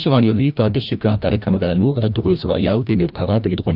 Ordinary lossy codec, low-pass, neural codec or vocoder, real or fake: none; 5.4 kHz; codec, 16 kHz, 1 kbps, FreqCodec, larger model; fake